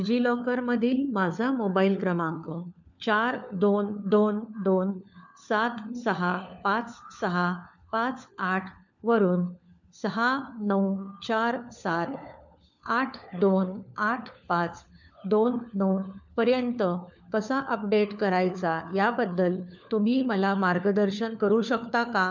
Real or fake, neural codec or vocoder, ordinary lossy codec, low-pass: fake; codec, 16 kHz, 4 kbps, FunCodec, trained on LibriTTS, 50 frames a second; none; 7.2 kHz